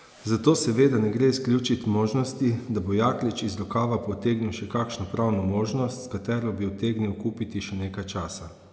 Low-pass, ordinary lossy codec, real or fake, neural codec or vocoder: none; none; real; none